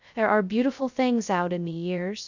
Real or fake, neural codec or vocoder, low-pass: fake; codec, 16 kHz, 0.2 kbps, FocalCodec; 7.2 kHz